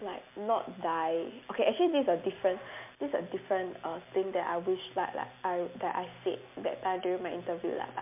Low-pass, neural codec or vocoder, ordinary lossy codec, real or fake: 3.6 kHz; none; MP3, 32 kbps; real